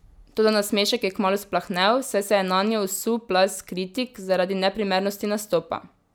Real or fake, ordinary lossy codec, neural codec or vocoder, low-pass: real; none; none; none